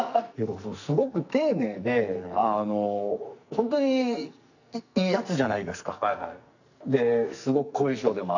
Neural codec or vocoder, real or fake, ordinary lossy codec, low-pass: codec, 44.1 kHz, 2.6 kbps, SNAC; fake; none; 7.2 kHz